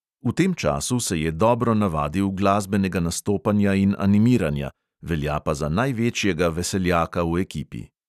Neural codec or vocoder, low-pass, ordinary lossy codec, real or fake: none; 14.4 kHz; none; real